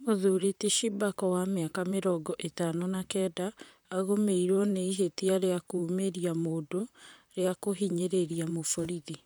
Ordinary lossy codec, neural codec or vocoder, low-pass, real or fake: none; vocoder, 44.1 kHz, 128 mel bands, Pupu-Vocoder; none; fake